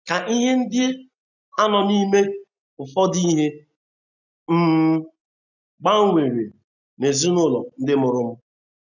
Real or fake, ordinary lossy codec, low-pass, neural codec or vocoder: real; none; 7.2 kHz; none